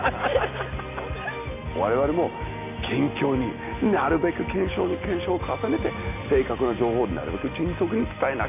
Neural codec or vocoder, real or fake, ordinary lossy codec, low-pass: none; real; none; 3.6 kHz